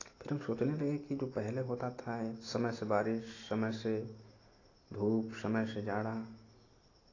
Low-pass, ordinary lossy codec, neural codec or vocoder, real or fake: 7.2 kHz; AAC, 32 kbps; none; real